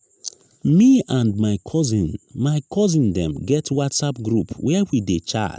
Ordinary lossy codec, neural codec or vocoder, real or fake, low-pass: none; none; real; none